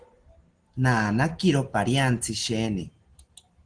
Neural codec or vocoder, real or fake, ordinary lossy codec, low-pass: none; real; Opus, 16 kbps; 9.9 kHz